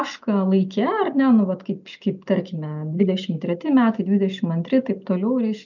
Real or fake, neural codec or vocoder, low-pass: real; none; 7.2 kHz